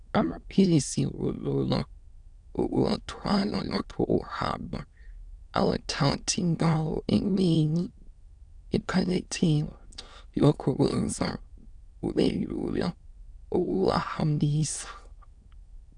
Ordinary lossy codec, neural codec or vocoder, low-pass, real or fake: Opus, 64 kbps; autoencoder, 22.05 kHz, a latent of 192 numbers a frame, VITS, trained on many speakers; 9.9 kHz; fake